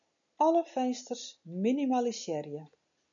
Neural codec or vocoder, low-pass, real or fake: none; 7.2 kHz; real